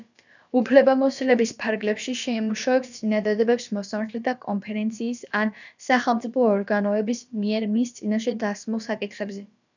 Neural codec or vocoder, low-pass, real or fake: codec, 16 kHz, about 1 kbps, DyCAST, with the encoder's durations; 7.2 kHz; fake